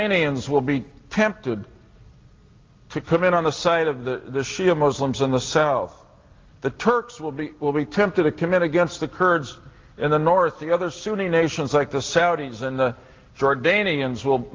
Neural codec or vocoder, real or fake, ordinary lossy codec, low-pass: none; real; Opus, 32 kbps; 7.2 kHz